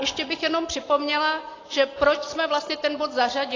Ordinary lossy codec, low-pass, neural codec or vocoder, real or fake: AAC, 32 kbps; 7.2 kHz; none; real